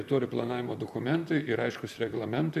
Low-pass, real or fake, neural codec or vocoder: 14.4 kHz; fake; vocoder, 44.1 kHz, 128 mel bands, Pupu-Vocoder